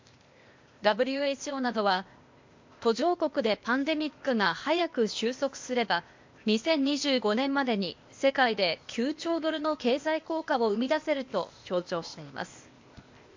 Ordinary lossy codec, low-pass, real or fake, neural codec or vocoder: MP3, 48 kbps; 7.2 kHz; fake; codec, 16 kHz, 0.8 kbps, ZipCodec